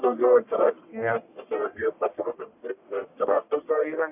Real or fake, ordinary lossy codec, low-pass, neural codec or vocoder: fake; AAC, 32 kbps; 3.6 kHz; codec, 44.1 kHz, 1.7 kbps, Pupu-Codec